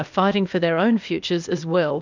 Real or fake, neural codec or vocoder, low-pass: fake; codec, 16 kHz, 0.8 kbps, ZipCodec; 7.2 kHz